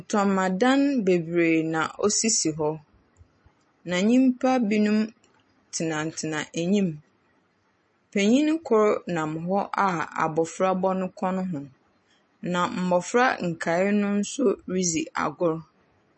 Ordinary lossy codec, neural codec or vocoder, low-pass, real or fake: MP3, 32 kbps; none; 10.8 kHz; real